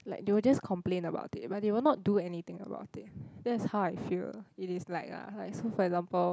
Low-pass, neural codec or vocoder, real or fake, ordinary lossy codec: none; none; real; none